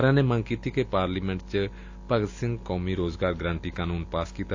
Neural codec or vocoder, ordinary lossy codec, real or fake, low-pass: none; AAC, 48 kbps; real; 7.2 kHz